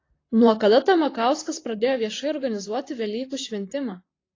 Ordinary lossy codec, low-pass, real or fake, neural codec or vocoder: AAC, 32 kbps; 7.2 kHz; fake; vocoder, 44.1 kHz, 128 mel bands, Pupu-Vocoder